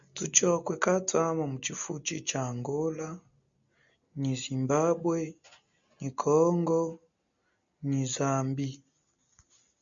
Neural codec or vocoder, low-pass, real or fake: none; 7.2 kHz; real